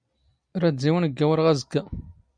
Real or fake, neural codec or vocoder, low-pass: real; none; 9.9 kHz